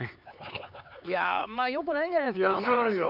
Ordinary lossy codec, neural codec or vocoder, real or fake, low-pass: none; codec, 16 kHz, 4 kbps, X-Codec, HuBERT features, trained on LibriSpeech; fake; 5.4 kHz